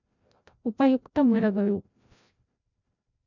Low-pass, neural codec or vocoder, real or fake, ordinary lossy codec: 7.2 kHz; codec, 16 kHz, 0.5 kbps, FreqCodec, larger model; fake; none